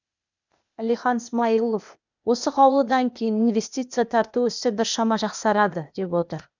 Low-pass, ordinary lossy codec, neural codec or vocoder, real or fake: 7.2 kHz; none; codec, 16 kHz, 0.8 kbps, ZipCodec; fake